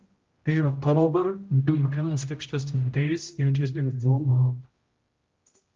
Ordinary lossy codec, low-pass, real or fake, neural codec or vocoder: Opus, 32 kbps; 7.2 kHz; fake; codec, 16 kHz, 0.5 kbps, X-Codec, HuBERT features, trained on general audio